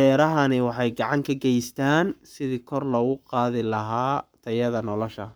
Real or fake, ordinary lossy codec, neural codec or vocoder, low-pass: fake; none; codec, 44.1 kHz, 7.8 kbps, Pupu-Codec; none